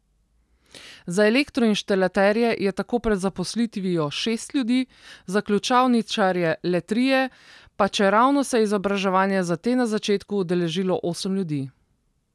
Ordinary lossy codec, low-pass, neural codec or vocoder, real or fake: none; none; none; real